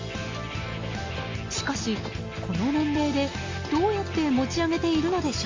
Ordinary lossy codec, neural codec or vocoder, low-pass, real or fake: Opus, 32 kbps; none; 7.2 kHz; real